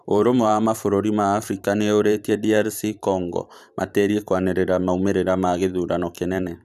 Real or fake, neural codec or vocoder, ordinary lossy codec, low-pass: fake; vocoder, 44.1 kHz, 128 mel bands every 512 samples, BigVGAN v2; none; 14.4 kHz